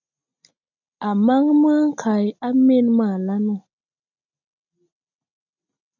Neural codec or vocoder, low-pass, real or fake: none; 7.2 kHz; real